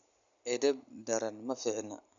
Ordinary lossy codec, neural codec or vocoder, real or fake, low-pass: none; none; real; 7.2 kHz